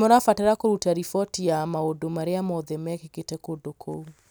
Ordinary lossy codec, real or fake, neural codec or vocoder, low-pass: none; real; none; none